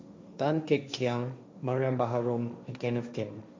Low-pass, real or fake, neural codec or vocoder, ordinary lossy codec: none; fake; codec, 16 kHz, 1.1 kbps, Voila-Tokenizer; none